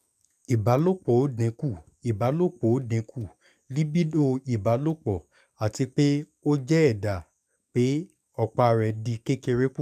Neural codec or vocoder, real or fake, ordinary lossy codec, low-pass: codec, 44.1 kHz, 7.8 kbps, DAC; fake; none; 14.4 kHz